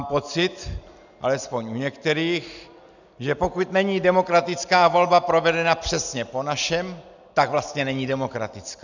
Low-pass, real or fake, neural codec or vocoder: 7.2 kHz; real; none